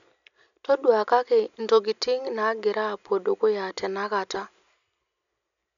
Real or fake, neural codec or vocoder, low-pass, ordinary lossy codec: real; none; 7.2 kHz; none